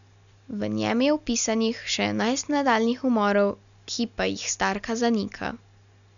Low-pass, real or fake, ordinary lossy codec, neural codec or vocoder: 7.2 kHz; real; none; none